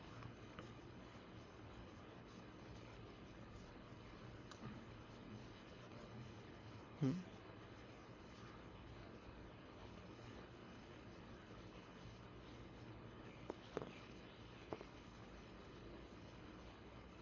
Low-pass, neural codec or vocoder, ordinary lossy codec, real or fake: 7.2 kHz; codec, 24 kHz, 6 kbps, HILCodec; AAC, 32 kbps; fake